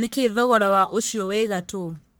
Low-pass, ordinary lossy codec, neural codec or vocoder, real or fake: none; none; codec, 44.1 kHz, 1.7 kbps, Pupu-Codec; fake